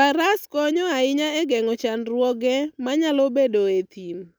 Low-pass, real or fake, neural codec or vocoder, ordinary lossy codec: none; real; none; none